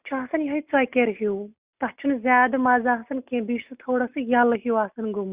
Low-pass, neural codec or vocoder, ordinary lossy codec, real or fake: 3.6 kHz; none; Opus, 64 kbps; real